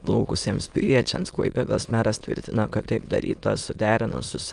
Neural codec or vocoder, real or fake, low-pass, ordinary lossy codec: autoencoder, 22.05 kHz, a latent of 192 numbers a frame, VITS, trained on many speakers; fake; 9.9 kHz; Opus, 32 kbps